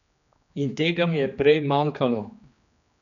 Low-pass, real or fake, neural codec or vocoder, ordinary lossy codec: 7.2 kHz; fake; codec, 16 kHz, 2 kbps, X-Codec, HuBERT features, trained on general audio; none